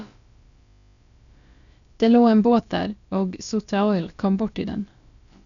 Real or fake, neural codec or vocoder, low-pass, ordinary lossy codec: fake; codec, 16 kHz, about 1 kbps, DyCAST, with the encoder's durations; 7.2 kHz; none